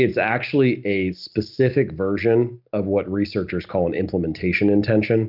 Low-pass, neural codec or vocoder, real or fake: 5.4 kHz; none; real